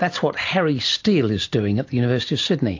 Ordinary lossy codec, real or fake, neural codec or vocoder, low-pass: AAC, 48 kbps; real; none; 7.2 kHz